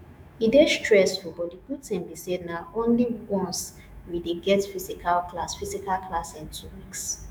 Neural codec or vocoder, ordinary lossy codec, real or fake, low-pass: autoencoder, 48 kHz, 128 numbers a frame, DAC-VAE, trained on Japanese speech; none; fake; none